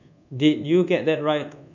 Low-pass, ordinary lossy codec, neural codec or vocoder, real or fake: 7.2 kHz; none; codec, 24 kHz, 1.2 kbps, DualCodec; fake